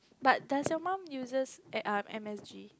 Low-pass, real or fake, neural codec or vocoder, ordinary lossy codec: none; real; none; none